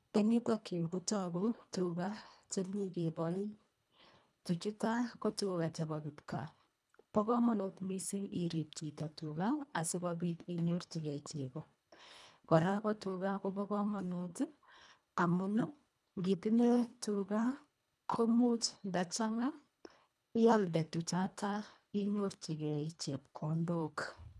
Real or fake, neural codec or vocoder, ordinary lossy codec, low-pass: fake; codec, 24 kHz, 1.5 kbps, HILCodec; none; none